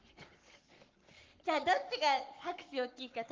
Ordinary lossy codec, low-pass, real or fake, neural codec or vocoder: Opus, 32 kbps; 7.2 kHz; fake; codec, 44.1 kHz, 7.8 kbps, Pupu-Codec